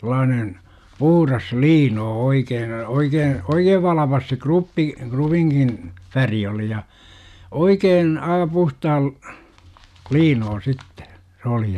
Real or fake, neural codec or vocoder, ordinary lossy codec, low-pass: real; none; none; 14.4 kHz